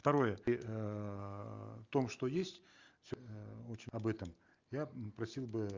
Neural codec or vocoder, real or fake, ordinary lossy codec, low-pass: none; real; Opus, 32 kbps; 7.2 kHz